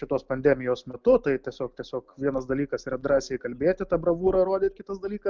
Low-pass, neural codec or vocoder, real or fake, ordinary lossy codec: 7.2 kHz; none; real; Opus, 24 kbps